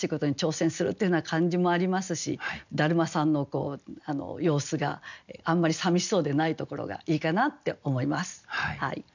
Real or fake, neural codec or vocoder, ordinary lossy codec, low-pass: real; none; none; 7.2 kHz